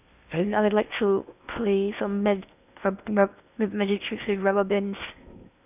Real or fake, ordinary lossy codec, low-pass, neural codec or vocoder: fake; none; 3.6 kHz; codec, 16 kHz in and 24 kHz out, 0.8 kbps, FocalCodec, streaming, 65536 codes